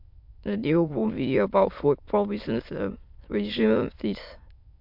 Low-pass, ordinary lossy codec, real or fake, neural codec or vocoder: 5.4 kHz; MP3, 48 kbps; fake; autoencoder, 22.05 kHz, a latent of 192 numbers a frame, VITS, trained on many speakers